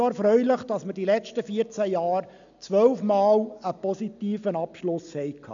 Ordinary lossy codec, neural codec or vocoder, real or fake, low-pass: MP3, 96 kbps; none; real; 7.2 kHz